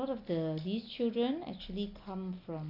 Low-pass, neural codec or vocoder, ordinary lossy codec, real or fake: 5.4 kHz; none; none; real